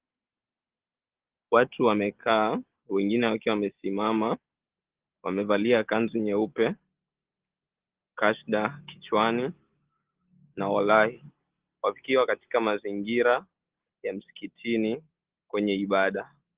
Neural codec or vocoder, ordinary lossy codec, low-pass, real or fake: none; Opus, 16 kbps; 3.6 kHz; real